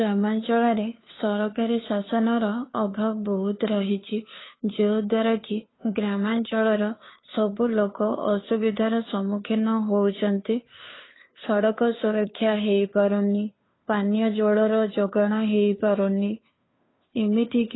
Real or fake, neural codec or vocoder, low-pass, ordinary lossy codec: fake; codec, 16 kHz, 2 kbps, FunCodec, trained on Chinese and English, 25 frames a second; 7.2 kHz; AAC, 16 kbps